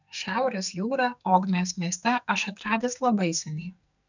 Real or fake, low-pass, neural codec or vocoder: fake; 7.2 kHz; codec, 44.1 kHz, 2.6 kbps, SNAC